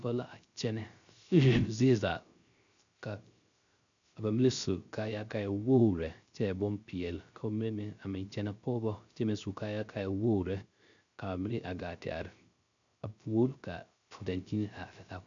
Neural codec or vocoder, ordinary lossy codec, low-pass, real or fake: codec, 16 kHz, 0.3 kbps, FocalCodec; MP3, 64 kbps; 7.2 kHz; fake